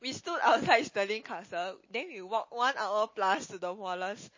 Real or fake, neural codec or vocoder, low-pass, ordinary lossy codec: real; none; 7.2 kHz; MP3, 32 kbps